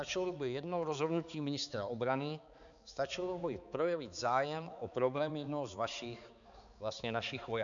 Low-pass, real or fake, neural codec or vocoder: 7.2 kHz; fake; codec, 16 kHz, 4 kbps, X-Codec, HuBERT features, trained on balanced general audio